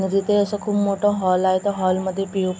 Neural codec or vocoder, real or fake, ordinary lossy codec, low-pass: none; real; none; none